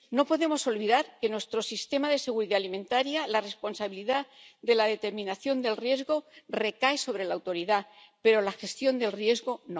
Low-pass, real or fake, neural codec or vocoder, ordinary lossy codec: none; real; none; none